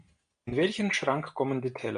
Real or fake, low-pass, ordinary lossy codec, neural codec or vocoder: real; 9.9 kHz; AAC, 96 kbps; none